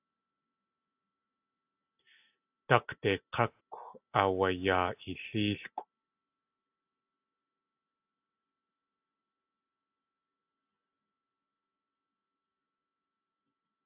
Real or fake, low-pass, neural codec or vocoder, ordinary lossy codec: real; 3.6 kHz; none; AAC, 32 kbps